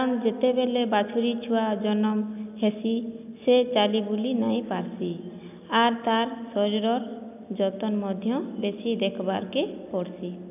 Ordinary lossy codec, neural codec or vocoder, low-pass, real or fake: AAC, 32 kbps; none; 3.6 kHz; real